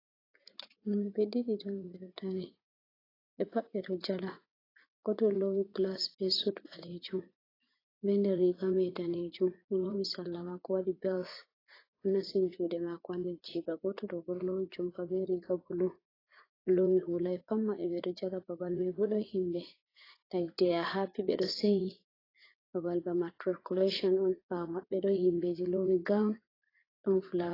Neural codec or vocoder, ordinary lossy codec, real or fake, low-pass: vocoder, 44.1 kHz, 80 mel bands, Vocos; AAC, 24 kbps; fake; 5.4 kHz